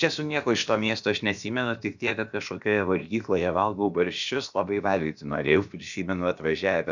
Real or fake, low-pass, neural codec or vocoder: fake; 7.2 kHz; codec, 16 kHz, about 1 kbps, DyCAST, with the encoder's durations